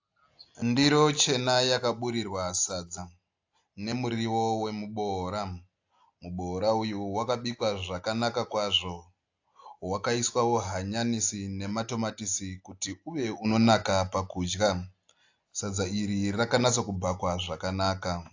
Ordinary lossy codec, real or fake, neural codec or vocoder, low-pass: AAC, 48 kbps; real; none; 7.2 kHz